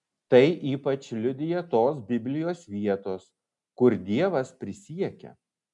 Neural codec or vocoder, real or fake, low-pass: none; real; 10.8 kHz